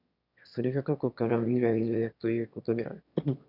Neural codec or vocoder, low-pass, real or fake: autoencoder, 22.05 kHz, a latent of 192 numbers a frame, VITS, trained on one speaker; 5.4 kHz; fake